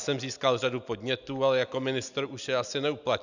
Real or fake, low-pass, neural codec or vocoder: real; 7.2 kHz; none